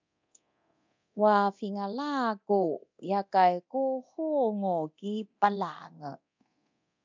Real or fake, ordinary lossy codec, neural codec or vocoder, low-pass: fake; MP3, 64 kbps; codec, 24 kHz, 0.9 kbps, DualCodec; 7.2 kHz